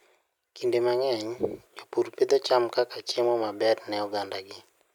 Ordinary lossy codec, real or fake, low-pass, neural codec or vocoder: none; real; 19.8 kHz; none